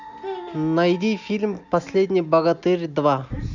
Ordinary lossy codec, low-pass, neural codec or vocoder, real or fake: none; 7.2 kHz; none; real